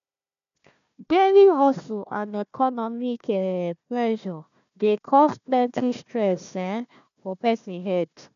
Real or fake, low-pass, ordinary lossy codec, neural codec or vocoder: fake; 7.2 kHz; none; codec, 16 kHz, 1 kbps, FunCodec, trained on Chinese and English, 50 frames a second